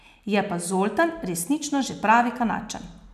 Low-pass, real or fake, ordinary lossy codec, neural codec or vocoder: 14.4 kHz; real; none; none